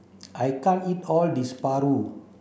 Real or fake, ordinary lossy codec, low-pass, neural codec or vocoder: real; none; none; none